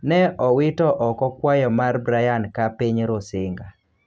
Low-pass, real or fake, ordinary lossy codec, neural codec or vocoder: none; real; none; none